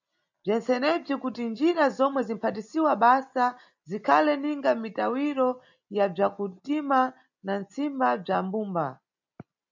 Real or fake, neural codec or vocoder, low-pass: real; none; 7.2 kHz